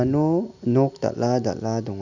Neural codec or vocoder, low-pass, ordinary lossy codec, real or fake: none; 7.2 kHz; none; real